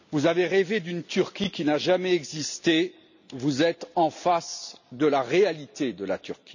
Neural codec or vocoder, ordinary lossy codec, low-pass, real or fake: none; none; 7.2 kHz; real